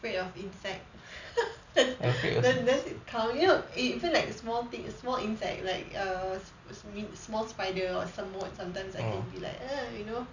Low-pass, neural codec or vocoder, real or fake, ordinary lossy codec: 7.2 kHz; none; real; none